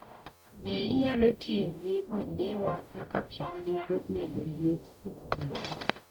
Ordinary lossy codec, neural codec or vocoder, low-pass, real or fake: none; codec, 44.1 kHz, 0.9 kbps, DAC; none; fake